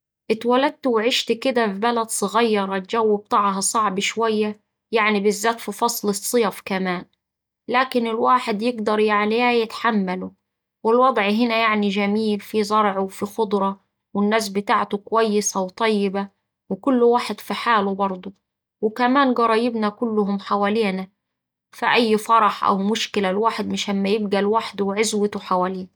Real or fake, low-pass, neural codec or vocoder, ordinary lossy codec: real; none; none; none